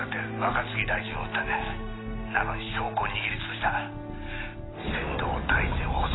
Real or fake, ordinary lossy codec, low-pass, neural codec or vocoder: real; AAC, 16 kbps; 7.2 kHz; none